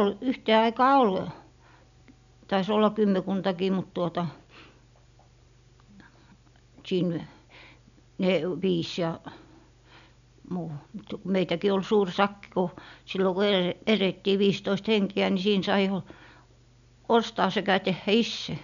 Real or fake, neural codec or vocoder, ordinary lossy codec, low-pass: real; none; none; 7.2 kHz